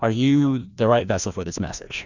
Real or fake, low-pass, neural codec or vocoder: fake; 7.2 kHz; codec, 16 kHz, 1 kbps, FreqCodec, larger model